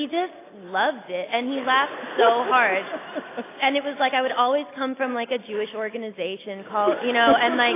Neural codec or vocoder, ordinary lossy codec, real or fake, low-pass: none; AAC, 24 kbps; real; 3.6 kHz